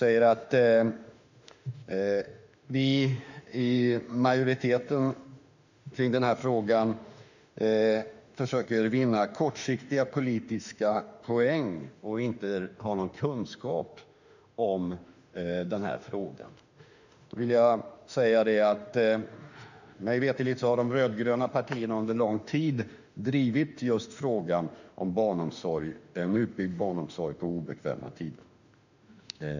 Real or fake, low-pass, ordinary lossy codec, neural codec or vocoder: fake; 7.2 kHz; none; autoencoder, 48 kHz, 32 numbers a frame, DAC-VAE, trained on Japanese speech